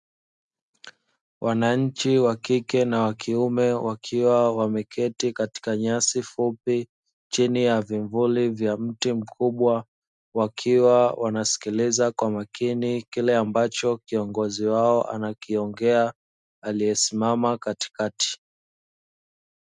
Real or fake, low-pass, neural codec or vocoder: real; 10.8 kHz; none